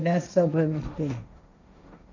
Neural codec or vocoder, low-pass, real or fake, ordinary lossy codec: codec, 16 kHz, 1.1 kbps, Voila-Tokenizer; 7.2 kHz; fake; none